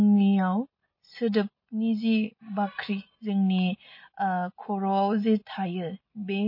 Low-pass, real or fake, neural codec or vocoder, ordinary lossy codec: 5.4 kHz; real; none; MP3, 24 kbps